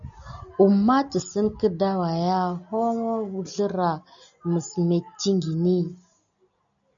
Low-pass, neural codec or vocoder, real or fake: 7.2 kHz; none; real